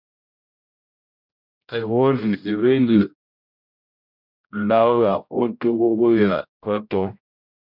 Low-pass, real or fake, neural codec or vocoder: 5.4 kHz; fake; codec, 16 kHz, 0.5 kbps, X-Codec, HuBERT features, trained on general audio